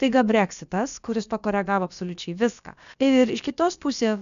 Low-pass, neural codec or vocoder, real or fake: 7.2 kHz; codec, 16 kHz, about 1 kbps, DyCAST, with the encoder's durations; fake